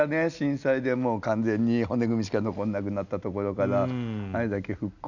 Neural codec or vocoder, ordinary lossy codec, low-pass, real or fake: none; none; 7.2 kHz; real